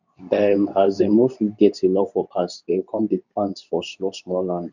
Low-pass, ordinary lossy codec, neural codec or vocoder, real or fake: 7.2 kHz; none; codec, 24 kHz, 0.9 kbps, WavTokenizer, medium speech release version 2; fake